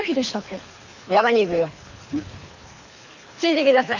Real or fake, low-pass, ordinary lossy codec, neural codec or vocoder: fake; 7.2 kHz; Opus, 64 kbps; codec, 24 kHz, 3 kbps, HILCodec